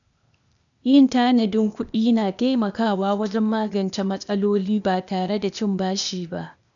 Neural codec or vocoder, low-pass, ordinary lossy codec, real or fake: codec, 16 kHz, 0.8 kbps, ZipCodec; 7.2 kHz; none; fake